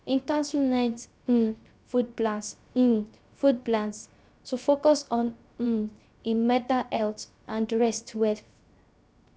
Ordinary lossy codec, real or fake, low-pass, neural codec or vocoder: none; fake; none; codec, 16 kHz, 0.3 kbps, FocalCodec